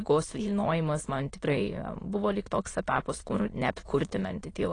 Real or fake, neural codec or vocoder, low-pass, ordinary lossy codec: fake; autoencoder, 22.05 kHz, a latent of 192 numbers a frame, VITS, trained on many speakers; 9.9 kHz; AAC, 32 kbps